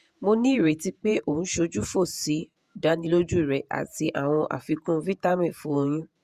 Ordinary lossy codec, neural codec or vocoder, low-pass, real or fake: Opus, 64 kbps; vocoder, 48 kHz, 128 mel bands, Vocos; 14.4 kHz; fake